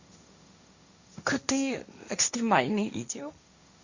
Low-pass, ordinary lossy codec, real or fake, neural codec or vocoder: 7.2 kHz; Opus, 64 kbps; fake; codec, 16 kHz, 1.1 kbps, Voila-Tokenizer